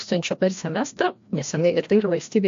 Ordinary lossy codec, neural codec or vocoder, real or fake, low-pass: AAC, 64 kbps; codec, 16 kHz, 1 kbps, FreqCodec, larger model; fake; 7.2 kHz